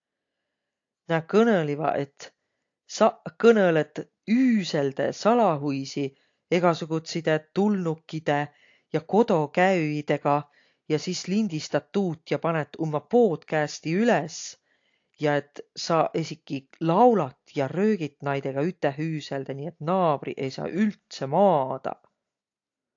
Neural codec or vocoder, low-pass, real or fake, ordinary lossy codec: none; 7.2 kHz; real; AAC, 48 kbps